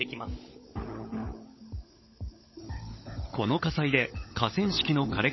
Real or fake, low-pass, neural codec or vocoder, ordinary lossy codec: fake; 7.2 kHz; codec, 16 kHz, 16 kbps, FunCodec, trained on LibriTTS, 50 frames a second; MP3, 24 kbps